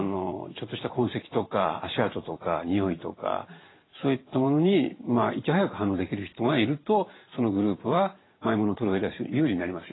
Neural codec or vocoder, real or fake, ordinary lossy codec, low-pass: vocoder, 44.1 kHz, 128 mel bands every 512 samples, BigVGAN v2; fake; AAC, 16 kbps; 7.2 kHz